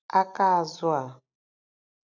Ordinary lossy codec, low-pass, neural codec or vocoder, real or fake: none; 7.2 kHz; none; real